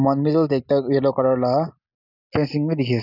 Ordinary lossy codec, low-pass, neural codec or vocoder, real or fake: none; 5.4 kHz; none; real